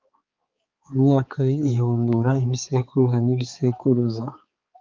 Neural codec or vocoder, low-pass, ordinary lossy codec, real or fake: codec, 16 kHz, 4 kbps, X-Codec, HuBERT features, trained on balanced general audio; 7.2 kHz; Opus, 24 kbps; fake